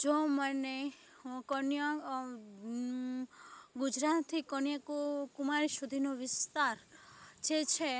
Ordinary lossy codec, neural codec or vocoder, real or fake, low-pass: none; none; real; none